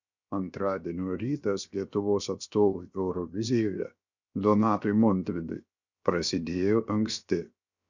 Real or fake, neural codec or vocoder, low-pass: fake; codec, 16 kHz, 0.7 kbps, FocalCodec; 7.2 kHz